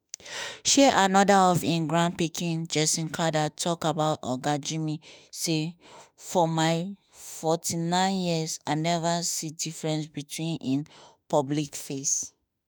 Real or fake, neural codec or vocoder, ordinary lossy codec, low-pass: fake; autoencoder, 48 kHz, 32 numbers a frame, DAC-VAE, trained on Japanese speech; none; none